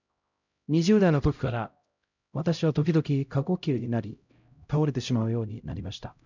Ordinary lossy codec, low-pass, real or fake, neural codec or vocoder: none; 7.2 kHz; fake; codec, 16 kHz, 0.5 kbps, X-Codec, HuBERT features, trained on LibriSpeech